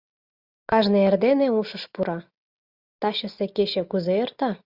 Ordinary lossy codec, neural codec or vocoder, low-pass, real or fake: Opus, 64 kbps; none; 5.4 kHz; real